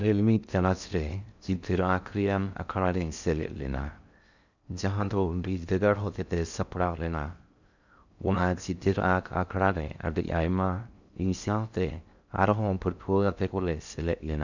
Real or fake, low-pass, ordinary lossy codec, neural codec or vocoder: fake; 7.2 kHz; none; codec, 16 kHz in and 24 kHz out, 0.6 kbps, FocalCodec, streaming, 2048 codes